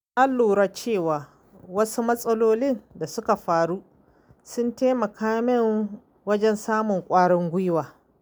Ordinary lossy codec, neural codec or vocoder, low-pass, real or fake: none; none; none; real